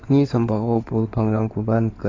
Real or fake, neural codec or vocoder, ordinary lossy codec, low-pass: fake; codec, 16 kHz in and 24 kHz out, 2.2 kbps, FireRedTTS-2 codec; AAC, 32 kbps; 7.2 kHz